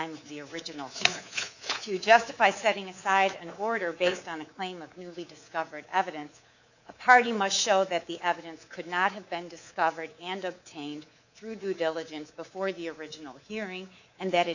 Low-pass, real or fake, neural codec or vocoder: 7.2 kHz; fake; codec, 24 kHz, 3.1 kbps, DualCodec